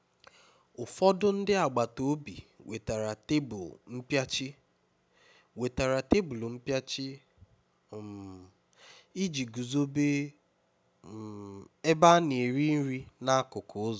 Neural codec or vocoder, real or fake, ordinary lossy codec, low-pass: none; real; none; none